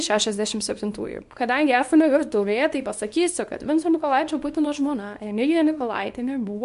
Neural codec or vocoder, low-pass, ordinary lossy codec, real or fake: codec, 24 kHz, 0.9 kbps, WavTokenizer, small release; 10.8 kHz; MP3, 64 kbps; fake